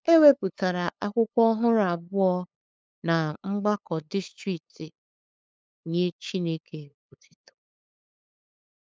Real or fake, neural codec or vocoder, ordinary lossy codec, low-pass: fake; codec, 16 kHz, 8 kbps, FunCodec, trained on LibriTTS, 25 frames a second; none; none